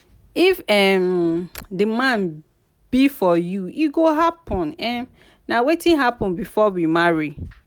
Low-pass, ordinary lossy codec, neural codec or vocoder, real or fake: none; none; none; real